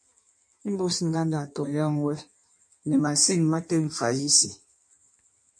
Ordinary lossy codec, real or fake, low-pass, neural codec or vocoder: MP3, 48 kbps; fake; 9.9 kHz; codec, 16 kHz in and 24 kHz out, 1.1 kbps, FireRedTTS-2 codec